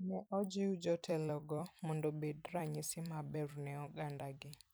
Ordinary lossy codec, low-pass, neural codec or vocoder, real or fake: none; none; vocoder, 44.1 kHz, 128 mel bands every 256 samples, BigVGAN v2; fake